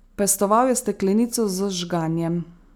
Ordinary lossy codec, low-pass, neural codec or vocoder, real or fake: none; none; none; real